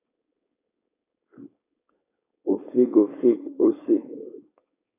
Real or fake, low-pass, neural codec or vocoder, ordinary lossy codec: fake; 3.6 kHz; codec, 16 kHz, 4.8 kbps, FACodec; AAC, 16 kbps